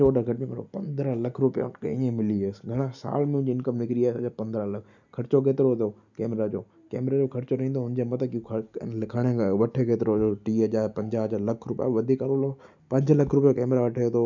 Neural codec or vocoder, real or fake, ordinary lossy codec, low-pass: none; real; none; 7.2 kHz